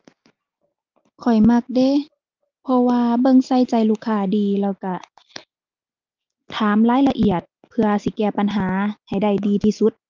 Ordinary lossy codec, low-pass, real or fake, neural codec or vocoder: Opus, 24 kbps; 7.2 kHz; real; none